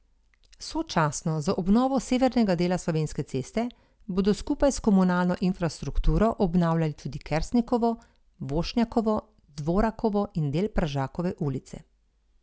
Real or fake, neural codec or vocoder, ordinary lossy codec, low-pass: real; none; none; none